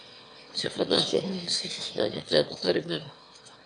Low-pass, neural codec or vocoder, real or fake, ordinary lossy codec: 9.9 kHz; autoencoder, 22.05 kHz, a latent of 192 numbers a frame, VITS, trained on one speaker; fake; AAC, 64 kbps